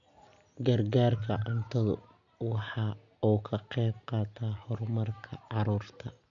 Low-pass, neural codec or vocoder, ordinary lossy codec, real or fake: 7.2 kHz; none; none; real